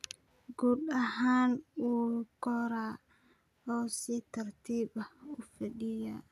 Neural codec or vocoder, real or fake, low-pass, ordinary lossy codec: none; real; 14.4 kHz; none